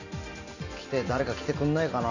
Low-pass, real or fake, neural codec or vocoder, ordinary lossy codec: 7.2 kHz; real; none; none